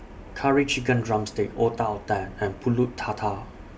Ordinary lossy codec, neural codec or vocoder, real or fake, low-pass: none; none; real; none